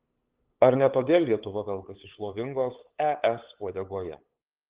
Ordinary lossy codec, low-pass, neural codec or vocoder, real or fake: Opus, 16 kbps; 3.6 kHz; codec, 16 kHz, 8 kbps, FunCodec, trained on LibriTTS, 25 frames a second; fake